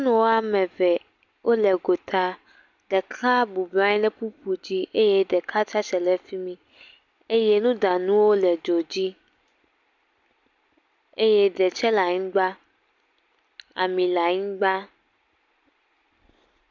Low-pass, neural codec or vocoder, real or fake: 7.2 kHz; none; real